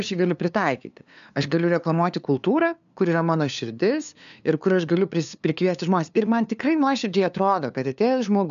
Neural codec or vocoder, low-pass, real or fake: codec, 16 kHz, 2 kbps, FunCodec, trained on LibriTTS, 25 frames a second; 7.2 kHz; fake